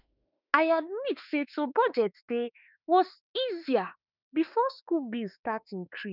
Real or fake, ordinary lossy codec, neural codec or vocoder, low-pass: fake; none; autoencoder, 48 kHz, 32 numbers a frame, DAC-VAE, trained on Japanese speech; 5.4 kHz